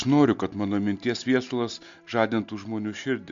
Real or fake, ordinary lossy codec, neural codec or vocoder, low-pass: real; MP3, 64 kbps; none; 7.2 kHz